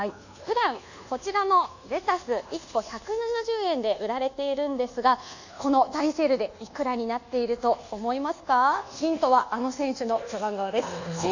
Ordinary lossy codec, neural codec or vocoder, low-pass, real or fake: none; codec, 24 kHz, 1.2 kbps, DualCodec; 7.2 kHz; fake